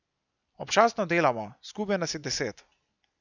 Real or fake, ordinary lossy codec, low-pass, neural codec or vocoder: real; none; 7.2 kHz; none